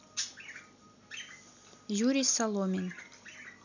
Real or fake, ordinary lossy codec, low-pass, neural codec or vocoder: real; none; 7.2 kHz; none